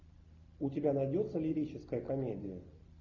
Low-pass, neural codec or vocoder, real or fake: 7.2 kHz; none; real